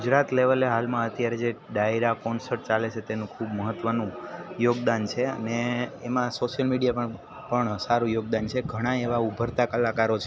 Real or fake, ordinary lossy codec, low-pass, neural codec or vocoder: real; none; none; none